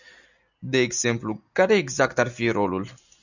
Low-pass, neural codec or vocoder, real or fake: 7.2 kHz; none; real